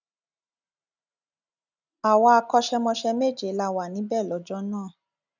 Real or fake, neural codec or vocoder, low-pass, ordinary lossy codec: real; none; 7.2 kHz; none